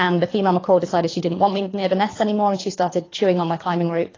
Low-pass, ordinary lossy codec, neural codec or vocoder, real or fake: 7.2 kHz; AAC, 32 kbps; codec, 24 kHz, 3 kbps, HILCodec; fake